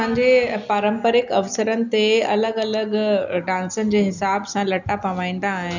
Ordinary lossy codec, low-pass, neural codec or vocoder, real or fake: none; 7.2 kHz; none; real